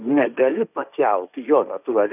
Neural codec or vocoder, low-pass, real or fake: codec, 16 kHz, 1.1 kbps, Voila-Tokenizer; 3.6 kHz; fake